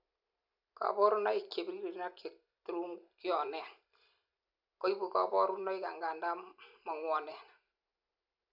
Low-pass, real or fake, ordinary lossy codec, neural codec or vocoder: 5.4 kHz; real; none; none